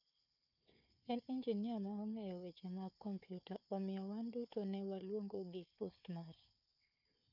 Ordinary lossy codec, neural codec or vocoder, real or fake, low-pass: none; codec, 16 kHz, 4 kbps, FunCodec, trained on Chinese and English, 50 frames a second; fake; 5.4 kHz